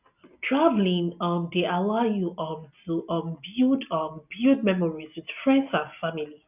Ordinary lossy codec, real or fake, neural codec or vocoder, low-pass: none; real; none; 3.6 kHz